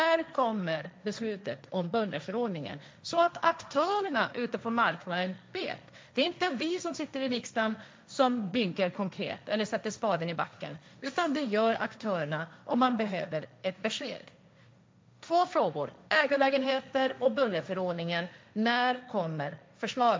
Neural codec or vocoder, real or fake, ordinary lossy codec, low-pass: codec, 16 kHz, 1.1 kbps, Voila-Tokenizer; fake; none; none